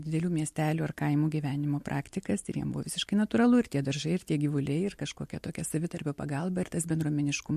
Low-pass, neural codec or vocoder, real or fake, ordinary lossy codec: 14.4 kHz; none; real; MP3, 64 kbps